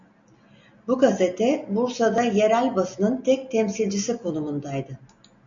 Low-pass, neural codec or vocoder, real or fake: 7.2 kHz; none; real